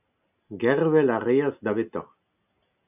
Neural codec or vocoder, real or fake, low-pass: none; real; 3.6 kHz